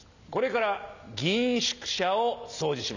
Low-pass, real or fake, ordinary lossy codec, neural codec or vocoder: 7.2 kHz; real; none; none